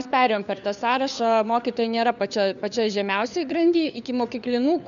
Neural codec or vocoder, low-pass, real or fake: codec, 16 kHz, 4 kbps, FunCodec, trained on LibriTTS, 50 frames a second; 7.2 kHz; fake